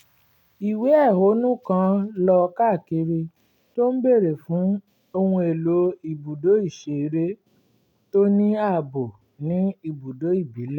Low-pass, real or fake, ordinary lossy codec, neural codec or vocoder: 19.8 kHz; real; none; none